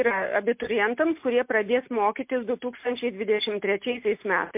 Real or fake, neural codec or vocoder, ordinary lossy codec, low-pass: real; none; MP3, 32 kbps; 3.6 kHz